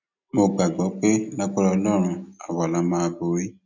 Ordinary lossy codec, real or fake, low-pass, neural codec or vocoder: none; real; 7.2 kHz; none